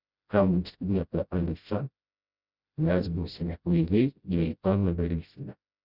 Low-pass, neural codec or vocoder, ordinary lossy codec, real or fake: 5.4 kHz; codec, 16 kHz, 0.5 kbps, FreqCodec, smaller model; Opus, 64 kbps; fake